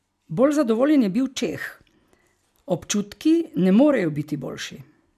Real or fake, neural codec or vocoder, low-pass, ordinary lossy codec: real; none; 14.4 kHz; none